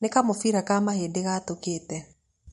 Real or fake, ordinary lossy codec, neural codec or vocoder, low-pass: fake; MP3, 48 kbps; vocoder, 44.1 kHz, 128 mel bands every 256 samples, BigVGAN v2; 14.4 kHz